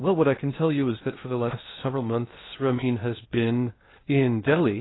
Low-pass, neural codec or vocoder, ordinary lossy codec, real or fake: 7.2 kHz; codec, 16 kHz in and 24 kHz out, 0.6 kbps, FocalCodec, streaming, 2048 codes; AAC, 16 kbps; fake